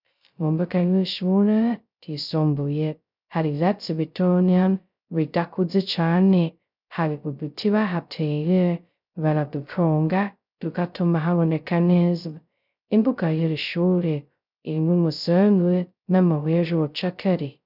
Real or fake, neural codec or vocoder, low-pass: fake; codec, 16 kHz, 0.2 kbps, FocalCodec; 5.4 kHz